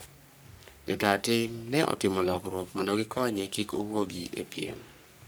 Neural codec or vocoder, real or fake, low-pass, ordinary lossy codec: codec, 44.1 kHz, 3.4 kbps, Pupu-Codec; fake; none; none